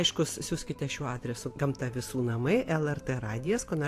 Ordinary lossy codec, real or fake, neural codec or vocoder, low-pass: AAC, 64 kbps; fake; vocoder, 44.1 kHz, 128 mel bands every 512 samples, BigVGAN v2; 14.4 kHz